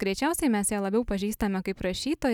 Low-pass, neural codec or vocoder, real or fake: 19.8 kHz; none; real